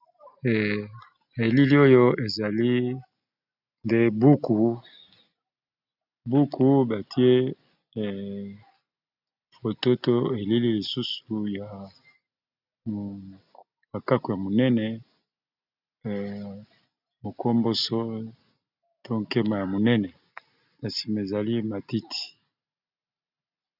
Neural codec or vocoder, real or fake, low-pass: none; real; 5.4 kHz